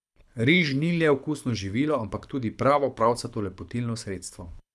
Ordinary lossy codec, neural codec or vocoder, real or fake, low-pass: none; codec, 24 kHz, 6 kbps, HILCodec; fake; none